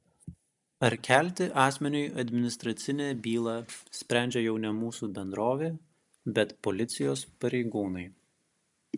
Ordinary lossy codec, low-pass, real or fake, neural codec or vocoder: MP3, 96 kbps; 10.8 kHz; real; none